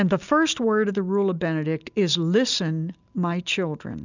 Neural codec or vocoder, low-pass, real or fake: none; 7.2 kHz; real